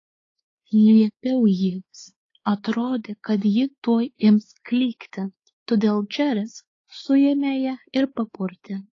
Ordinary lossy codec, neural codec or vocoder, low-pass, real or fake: AAC, 32 kbps; codec, 16 kHz, 4 kbps, X-Codec, WavLM features, trained on Multilingual LibriSpeech; 7.2 kHz; fake